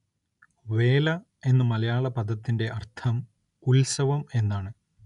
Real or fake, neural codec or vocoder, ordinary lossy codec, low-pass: real; none; none; 10.8 kHz